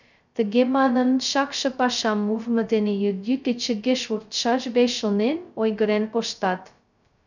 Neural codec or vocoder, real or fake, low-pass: codec, 16 kHz, 0.2 kbps, FocalCodec; fake; 7.2 kHz